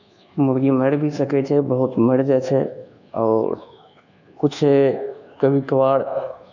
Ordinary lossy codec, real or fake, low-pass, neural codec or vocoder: none; fake; 7.2 kHz; codec, 24 kHz, 1.2 kbps, DualCodec